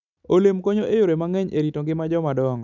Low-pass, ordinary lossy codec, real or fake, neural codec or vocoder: 7.2 kHz; none; real; none